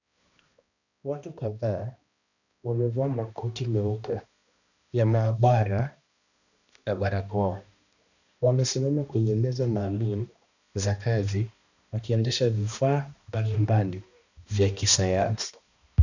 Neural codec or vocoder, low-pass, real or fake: codec, 16 kHz, 1 kbps, X-Codec, HuBERT features, trained on balanced general audio; 7.2 kHz; fake